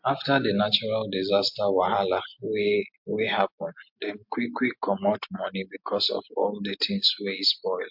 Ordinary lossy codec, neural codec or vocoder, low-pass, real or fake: MP3, 48 kbps; none; 5.4 kHz; real